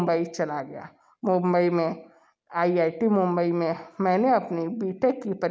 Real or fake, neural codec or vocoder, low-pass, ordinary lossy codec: real; none; none; none